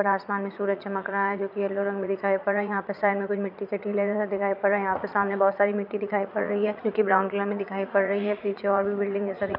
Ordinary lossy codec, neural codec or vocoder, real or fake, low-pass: none; vocoder, 44.1 kHz, 80 mel bands, Vocos; fake; 5.4 kHz